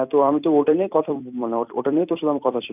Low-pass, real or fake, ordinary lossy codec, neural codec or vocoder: 3.6 kHz; fake; none; vocoder, 44.1 kHz, 128 mel bands every 256 samples, BigVGAN v2